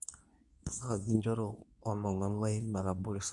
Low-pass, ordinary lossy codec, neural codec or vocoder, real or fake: 10.8 kHz; none; codec, 24 kHz, 0.9 kbps, WavTokenizer, medium speech release version 2; fake